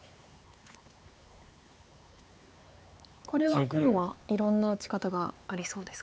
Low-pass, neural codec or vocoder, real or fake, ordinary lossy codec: none; codec, 16 kHz, 4 kbps, X-Codec, HuBERT features, trained on LibriSpeech; fake; none